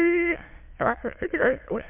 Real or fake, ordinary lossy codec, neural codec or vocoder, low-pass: fake; MP3, 32 kbps; autoencoder, 22.05 kHz, a latent of 192 numbers a frame, VITS, trained on many speakers; 3.6 kHz